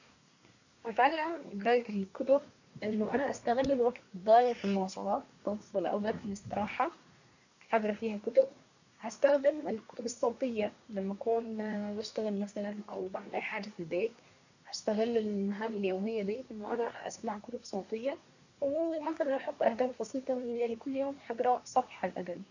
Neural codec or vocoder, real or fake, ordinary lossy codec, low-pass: codec, 24 kHz, 1 kbps, SNAC; fake; none; 7.2 kHz